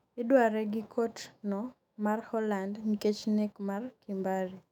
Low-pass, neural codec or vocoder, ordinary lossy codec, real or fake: 19.8 kHz; autoencoder, 48 kHz, 128 numbers a frame, DAC-VAE, trained on Japanese speech; none; fake